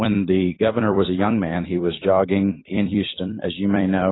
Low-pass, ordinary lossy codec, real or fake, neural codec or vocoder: 7.2 kHz; AAC, 16 kbps; fake; vocoder, 44.1 kHz, 128 mel bands every 256 samples, BigVGAN v2